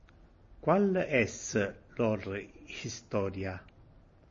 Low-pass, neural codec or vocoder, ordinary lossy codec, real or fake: 7.2 kHz; none; MP3, 32 kbps; real